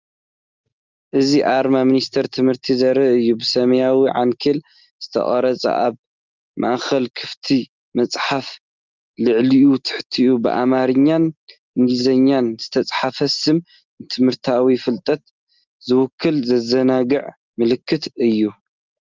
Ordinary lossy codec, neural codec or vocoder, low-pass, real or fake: Opus, 32 kbps; none; 7.2 kHz; real